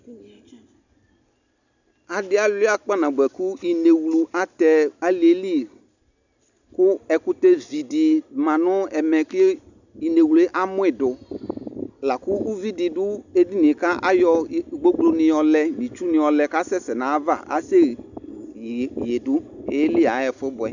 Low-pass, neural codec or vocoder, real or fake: 7.2 kHz; none; real